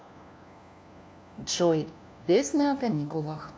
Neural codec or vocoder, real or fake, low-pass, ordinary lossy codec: codec, 16 kHz, 1 kbps, FunCodec, trained on LibriTTS, 50 frames a second; fake; none; none